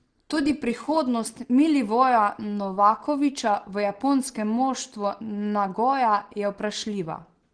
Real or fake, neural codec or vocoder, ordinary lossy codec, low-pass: real; none; Opus, 16 kbps; 9.9 kHz